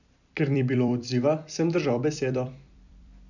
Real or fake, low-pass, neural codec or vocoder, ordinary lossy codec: fake; 7.2 kHz; vocoder, 44.1 kHz, 128 mel bands every 256 samples, BigVGAN v2; none